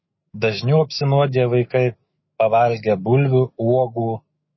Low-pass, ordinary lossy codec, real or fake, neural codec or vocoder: 7.2 kHz; MP3, 24 kbps; fake; autoencoder, 48 kHz, 128 numbers a frame, DAC-VAE, trained on Japanese speech